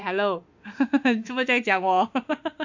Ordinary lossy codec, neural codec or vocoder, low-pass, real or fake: none; autoencoder, 48 kHz, 32 numbers a frame, DAC-VAE, trained on Japanese speech; 7.2 kHz; fake